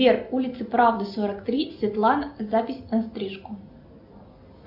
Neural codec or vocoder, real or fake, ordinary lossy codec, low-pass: none; real; AAC, 48 kbps; 5.4 kHz